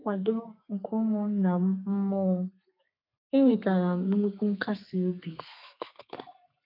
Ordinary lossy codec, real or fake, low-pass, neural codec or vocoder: none; fake; 5.4 kHz; codec, 32 kHz, 1.9 kbps, SNAC